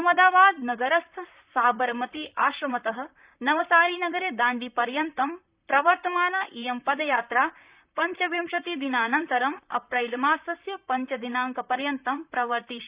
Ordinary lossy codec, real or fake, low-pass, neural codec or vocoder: Opus, 64 kbps; fake; 3.6 kHz; vocoder, 44.1 kHz, 128 mel bands, Pupu-Vocoder